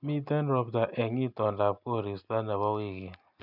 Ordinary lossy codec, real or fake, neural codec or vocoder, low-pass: none; real; none; 5.4 kHz